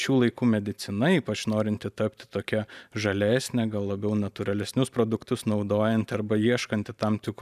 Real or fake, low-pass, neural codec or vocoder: fake; 14.4 kHz; vocoder, 44.1 kHz, 128 mel bands every 256 samples, BigVGAN v2